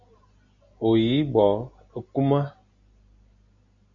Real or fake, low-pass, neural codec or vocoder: real; 7.2 kHz; none